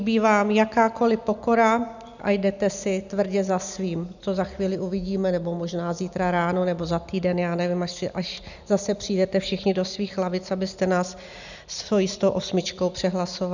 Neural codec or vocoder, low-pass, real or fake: none; 7.2 kHz; real